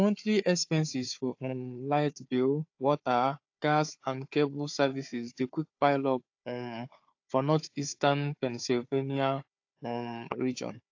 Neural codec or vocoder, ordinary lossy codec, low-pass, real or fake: codec, 16 kHz, 4 kbps, FunCodec, trained on Chinese and English, 50 frames a second; AAC, 48 kbps; 7.2 kHz; fake